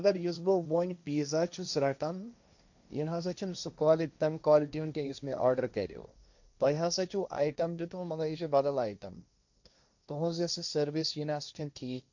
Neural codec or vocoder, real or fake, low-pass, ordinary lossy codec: codec, 16 kHz, 1.1 kbps, Voila-Tokenizer; fake; 7.2 kHz; none